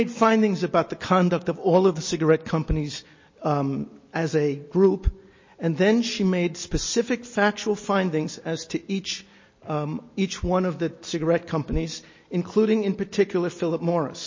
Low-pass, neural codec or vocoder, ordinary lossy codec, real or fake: 7.2 kHz; none; MP3, 32 kbps; real